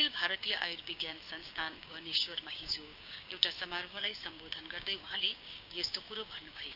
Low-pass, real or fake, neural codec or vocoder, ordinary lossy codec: 5.4 kHz; fake; codec, 16 kHz, 6 kbps, DAC; none